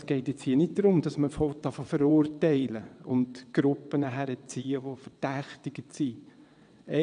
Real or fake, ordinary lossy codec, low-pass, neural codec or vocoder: fake; none; 9.9 kHz; vocoder, 22.05 kHz, 80 mel bands, WaveNeXt